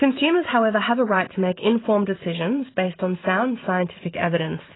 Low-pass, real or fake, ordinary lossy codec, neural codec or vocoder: 7.2 kHz; fake; AAC, 16 kbps; vocoder, 44.1 kHz, 128 mel bands every 512 samples, BigVGAN v2